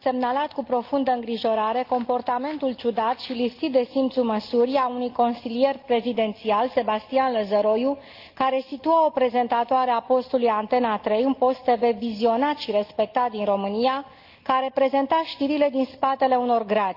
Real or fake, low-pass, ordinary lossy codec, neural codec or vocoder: real; 5.4 kHz; Opus, 24 kbps; none